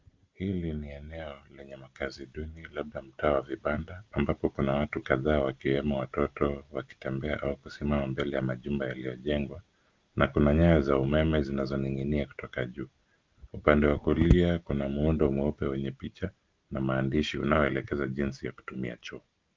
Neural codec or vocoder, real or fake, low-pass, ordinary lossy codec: none; real; 7.2 kHz; Opus, 32 kbps